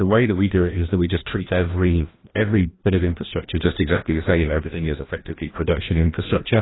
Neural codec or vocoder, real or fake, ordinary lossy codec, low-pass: codec, 16 kHz, 1 kbps, FreqCodec, larger model; fake; AAC, 16 kbps; 7.2 kHz